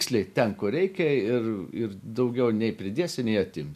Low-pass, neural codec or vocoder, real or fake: 14.4 kHz; none; real